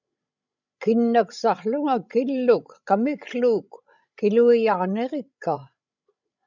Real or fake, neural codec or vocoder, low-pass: fake; codec, 16 kHz, 16 kbps, FreqCodec, larger model; 7.2 kHz